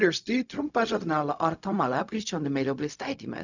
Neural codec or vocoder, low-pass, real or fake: codec, 16 kHz, 0.4 kbps, LongCat-Audio-Codec; 7.2 kHz; fake